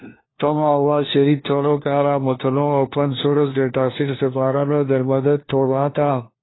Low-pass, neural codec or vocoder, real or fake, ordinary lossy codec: 7.2 kHz; codec, 16 kHz, 1 kbps, FunCodec, trained on LibriTTS, 50 frames a second; fake; AAC, 16 kbps